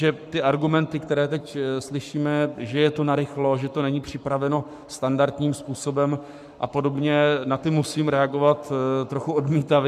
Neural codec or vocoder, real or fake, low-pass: codec, 44.1 kHz, 7.8 kbps, Pupu-Codec; fake; 14.4 kHz